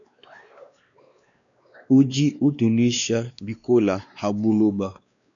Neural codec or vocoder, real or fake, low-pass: codec, 16 kHz, 2 kbps, X-Codec, WavLM features, trained on Multilingual LibriSpeech; fake; 7.2 kHz